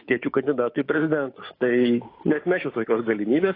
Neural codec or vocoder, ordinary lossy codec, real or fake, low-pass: codec, 16 kHz, 6 kbps, DAC; AAC, 24 kbps; fake; 5.4 kHz